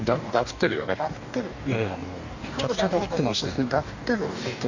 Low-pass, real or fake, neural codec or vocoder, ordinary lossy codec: 7.2 kHz; fake; codec, 44.1 kHz, 2.6 kbps, DAC; none